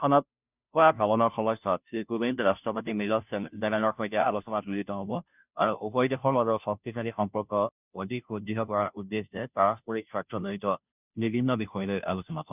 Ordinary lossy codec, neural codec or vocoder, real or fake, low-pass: none; codec, 16 kHz, 0.5 kbps, FunCodec, trained on Chinese and English, 25 frames a second; fake; 3.6 kHz